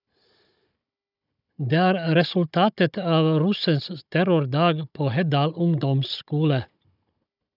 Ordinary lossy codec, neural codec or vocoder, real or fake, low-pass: none; codec, 16 kHz, 16 kbps, FunCodec, trained on Chinese and English, 50 frames a second; fake; 5.4 kHz